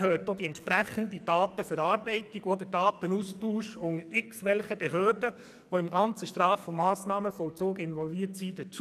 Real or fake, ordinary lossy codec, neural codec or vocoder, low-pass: fake; none; codec, 44.1 kHz, 2.6 kbps, SNAC; 14.4 kHz